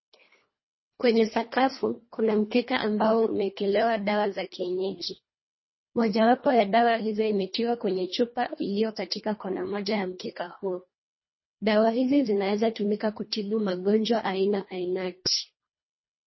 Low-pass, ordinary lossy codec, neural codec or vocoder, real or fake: 7.2 kHz; MP3, 24 kbps; codec, 24 kHz, 1.5 kbps, HILCodec; fake